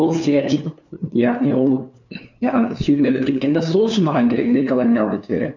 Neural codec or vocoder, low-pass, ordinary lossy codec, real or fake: codec, 16 kHz, 2 kbps, FunCodec, trained on LibriTTS, 25 frames a second; 7.2 kHz; none; fake